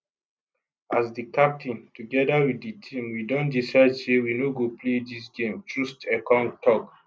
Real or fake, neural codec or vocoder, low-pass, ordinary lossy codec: real; none; none; none